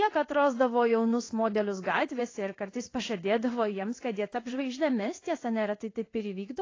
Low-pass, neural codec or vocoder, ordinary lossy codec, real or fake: 7.2 kHz; codec, 16 kHz in and 24 kHz out, 1 kbps, XY-Tokenizer; AAC, 32 kbps; fake